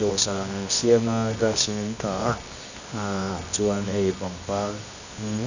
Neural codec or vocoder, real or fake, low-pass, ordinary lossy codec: codec, 24 kHz, 0.9 kbps, WavTokenizer, medium music audio release; fake; 7.2 kHz; none